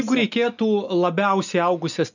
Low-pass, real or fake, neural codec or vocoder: 7.2 kHz; real; none